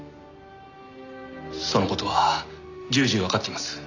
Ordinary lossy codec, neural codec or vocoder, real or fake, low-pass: none; none; real; 7.2 kHz